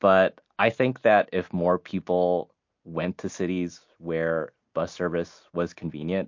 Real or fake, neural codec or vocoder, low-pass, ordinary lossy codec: real; none; 7.2 kHz; MP3, 48 kbps